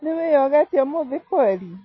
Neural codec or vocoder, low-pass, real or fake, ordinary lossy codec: none; 7.2 kHz; real; MP3, 24 kbps